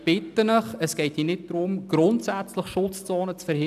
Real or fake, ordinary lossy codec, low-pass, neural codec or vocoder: real; none; 14.4 kHz; none